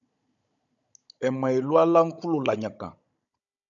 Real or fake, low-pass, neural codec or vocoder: fake; 7.2 kHz; codec, 16 kHz, 16 kbps, FunCodec, trained on Chinese and English, 50 frames a second